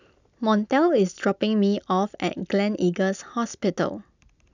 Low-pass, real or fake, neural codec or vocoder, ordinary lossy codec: 7.2 kHz; real; none; none